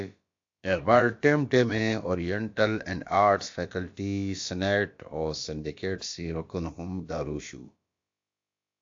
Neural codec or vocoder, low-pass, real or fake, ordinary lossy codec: codec, 16 kHz, about 1 kbps, DyCAST, with the encoder's durations; 7.2 kHz; fake; AAC, 48 kbps